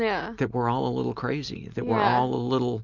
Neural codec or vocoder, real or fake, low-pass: none; real; 7.2 kHz